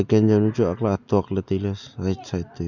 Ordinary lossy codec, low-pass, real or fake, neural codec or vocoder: none; 7.2 kHz; real; none